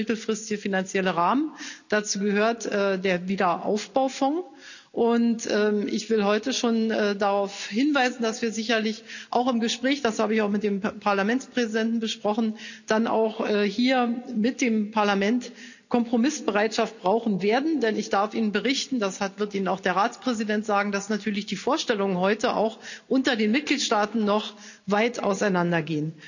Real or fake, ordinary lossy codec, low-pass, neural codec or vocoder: real; none; 7.2 kHz; none